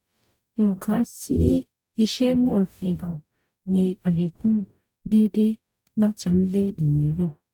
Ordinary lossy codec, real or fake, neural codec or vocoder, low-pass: none; fake; codec, 44.1 kHz, 0.9 kbps, DAC; 19.8 kHz